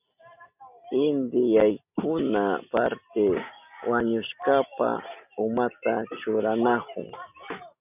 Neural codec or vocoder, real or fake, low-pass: none; real; 3.6 kHz